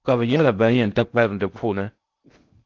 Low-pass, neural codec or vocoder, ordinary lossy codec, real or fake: 7.2 kHz; codec, 16 kHz in and 24 kHz out, 0.6 kbps, FocalCodec, streaming, 4096 codes; Opus, 32 kbps; fake